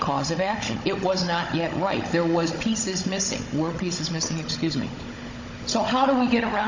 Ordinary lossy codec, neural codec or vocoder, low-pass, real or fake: MP3, 64 kbps; codec, 16 kHz, 16 kbps, FunCodec, trained on Chinese and English, 50 frames a second; 7.2 kHz; fake